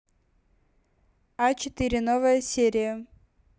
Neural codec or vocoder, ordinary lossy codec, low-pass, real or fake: none; none; none; real